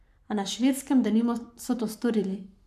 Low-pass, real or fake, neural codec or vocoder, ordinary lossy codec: 14.4 kHz; fake; codec, 44.1 kHz, 7.8 kbps, Pupu-Codec; none